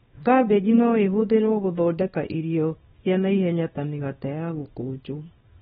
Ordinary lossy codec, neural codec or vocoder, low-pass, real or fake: AAC, 16 kbps; codec, 24 kHz, 0.9 kbps, WavTokenizer, small release; 10.8 kHz; fake